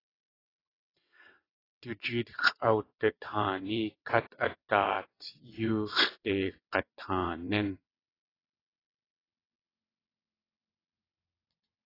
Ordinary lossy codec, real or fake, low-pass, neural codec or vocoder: AAC, 24 kbps; fake; 5.4 kHz; vocoder, 22.05 kHz, 80 mel bands, WaveNeXt